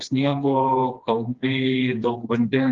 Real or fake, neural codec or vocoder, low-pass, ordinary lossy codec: fake; codec, 16 kHz, 2 kbps, FreqCodec, smaller model; 7.2 kHz; Opus, 32 kbps